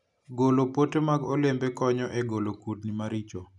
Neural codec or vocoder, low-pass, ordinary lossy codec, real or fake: none; 10.8 kHz; none; real